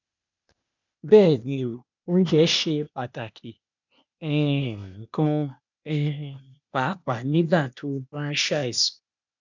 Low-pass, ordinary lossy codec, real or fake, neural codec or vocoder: 7.2 kHz; none; fake; codec, 16 kHz, 0.8 kbps, ZipCodec